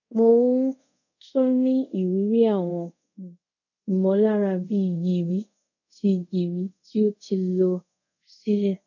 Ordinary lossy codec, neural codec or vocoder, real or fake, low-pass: none; codec, 24 kHz, 0.5 kbps, DualCodec; fake; 7.2 kHz